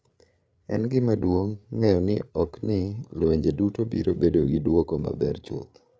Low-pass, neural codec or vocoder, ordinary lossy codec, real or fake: none; codec, 16 kHz, 8 kbps, FreqCodec, larger model; none; fake